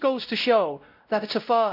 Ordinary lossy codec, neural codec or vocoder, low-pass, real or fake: none; codec, 16 kHz, 0.5 kbps, X-Codec, WavLM features, trained on Multilingual LibriSpeech; 5.4 kHz; fake